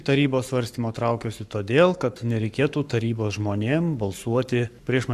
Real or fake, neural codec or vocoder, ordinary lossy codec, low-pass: fake; codec, 44.1 kHz, 7.8 kbps, Pupu-Codec; AAC, 96 kbps; 14.4 kHz